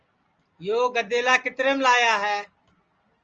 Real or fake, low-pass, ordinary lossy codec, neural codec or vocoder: real; 7.2 kHz; Opus, 24 kbps; none